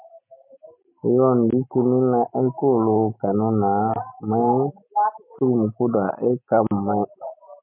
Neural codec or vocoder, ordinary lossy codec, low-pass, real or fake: none; MP3, 32 kbps; 3.6 kHz; real